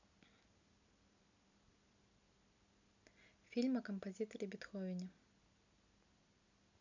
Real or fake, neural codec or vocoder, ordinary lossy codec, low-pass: real; none; none; 7.2 kHz